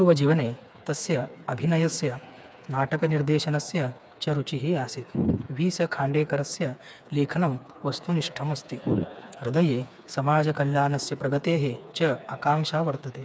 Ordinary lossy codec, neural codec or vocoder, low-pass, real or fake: none; codec, 16 kHz, 4 kbps, FreqCodec, smaller model; none; fake